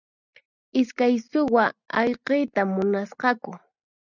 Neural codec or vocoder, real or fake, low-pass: none; real; 7.2 kHz